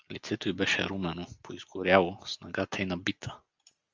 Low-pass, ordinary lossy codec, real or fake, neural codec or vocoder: 7.2 kHz; Opus, 24 kbps; real; none